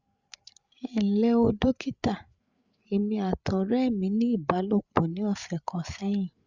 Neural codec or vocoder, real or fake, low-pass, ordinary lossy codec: codec, 16 kHz, 8 kbps, FreqCodec, larger model; fake; 7.2 kHz; Opus, 64 kbps